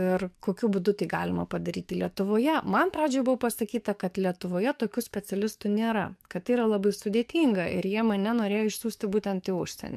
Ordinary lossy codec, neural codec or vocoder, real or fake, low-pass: MP3, 96 kbps; codec, 44.1 kHz, 7.8 kbps, DAC; fake; 14.4 kHz